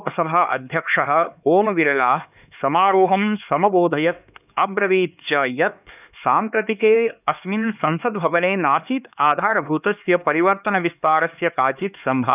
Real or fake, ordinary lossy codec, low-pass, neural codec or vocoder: fake; none; 3.6 kHz; codec, 16 kHz, 2 kbps, X-Codec, HuBERT features, trained on LibriSpeech